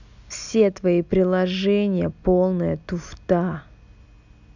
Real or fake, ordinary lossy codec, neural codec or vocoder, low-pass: real; none; none; 7.2 kHz